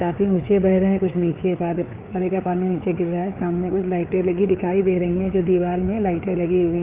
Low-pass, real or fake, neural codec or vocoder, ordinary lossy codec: 3.6 kHz; fake; codec, 16 kHz, 4 kbps, FreqCodec, larger model; Opus, 32 kbps